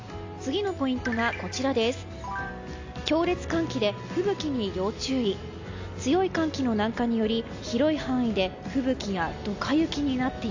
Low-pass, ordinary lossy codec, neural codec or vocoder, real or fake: 7.2 kHz; AAC, 48 kbps; none; real